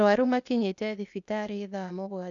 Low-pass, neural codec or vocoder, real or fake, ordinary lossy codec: 7.2 kHz; codec, 16 kHz, 0.8 kbps, ZipCodec; fake; none